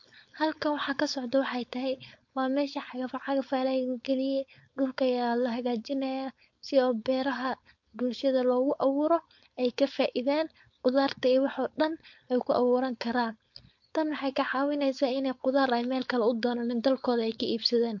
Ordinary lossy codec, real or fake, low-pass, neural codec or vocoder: MP3, 48 kbps; fake; 7.2 kHz; codec, 16 kHz, 4.8 kbps, FACodec